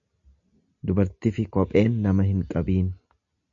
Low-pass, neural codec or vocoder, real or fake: 7.2 kHz; none; real